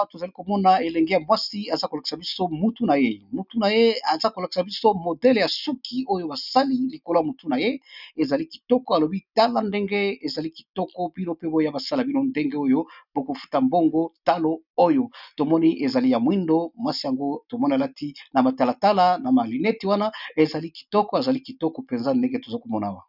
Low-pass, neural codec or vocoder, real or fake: 5.4 kHz; none; real